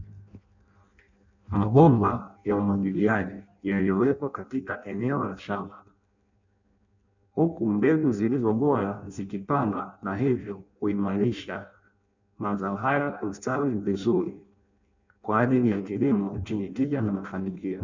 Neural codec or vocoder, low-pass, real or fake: codec, 16 kHz in and 24 kHz out, 0.6 kbps, FireRedTTS-2 codec; 7.2 kHz; fake